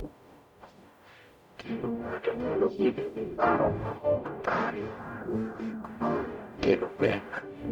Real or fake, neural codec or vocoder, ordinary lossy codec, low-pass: fake; codec, 44.1 kHz, 0.9 kbps, DAC; none; 19.8 kHz